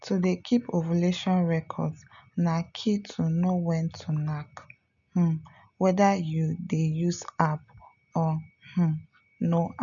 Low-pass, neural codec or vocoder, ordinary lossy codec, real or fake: 7.2 kHz; none; none; real